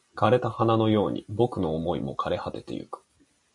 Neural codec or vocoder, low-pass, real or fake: none; 10.8 kHz; real